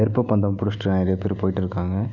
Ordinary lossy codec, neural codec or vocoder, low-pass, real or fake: none; none; 7.2 kHz; real